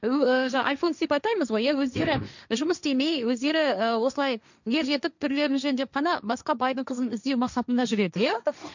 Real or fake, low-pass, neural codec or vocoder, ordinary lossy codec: fake; 7.2 kHz; codec, 16 kHz, 1.1 kbps, Voila-Tokenizer; none